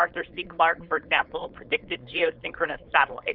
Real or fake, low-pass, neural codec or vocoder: fake; 5.4 kHz; codec, 16 kHz, 4.8 kbps, FACodec